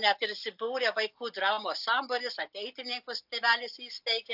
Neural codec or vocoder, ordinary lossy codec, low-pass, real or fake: none; AAC, 48 kbps; 5.4 kHz; real